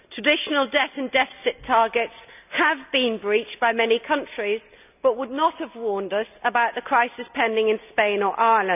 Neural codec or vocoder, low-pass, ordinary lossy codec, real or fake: none; 3.6 kHz; none; real